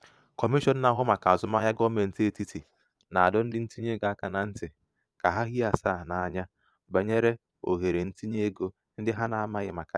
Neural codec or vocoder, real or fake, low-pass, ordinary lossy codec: vocoder, 22.05 kHz, 80 mel bands, Vocos; fake; none; none